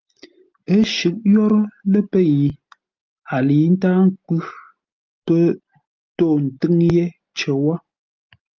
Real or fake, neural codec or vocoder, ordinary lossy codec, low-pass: real; none; Opus, 24 kbps; 7.2 kHz